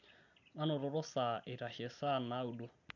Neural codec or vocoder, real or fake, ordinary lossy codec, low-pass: none; real; none; 7.2 kHz